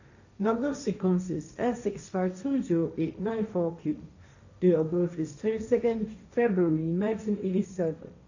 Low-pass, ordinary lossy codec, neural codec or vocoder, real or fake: 7.2 kHz; none; codec, 16 kHz, 1.1 kbps, Voila-Tokenizer; fake